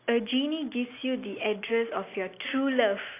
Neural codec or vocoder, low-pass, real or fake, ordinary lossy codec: none; 3.6 kHz; real; AAC, 24 kbps